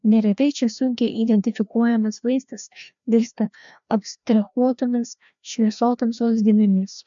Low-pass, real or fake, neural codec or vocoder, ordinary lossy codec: 7.2 kHz; fake; codec, 16 kHz, 1 kbps, FreqCodec, larger model; MP3, 64 kbps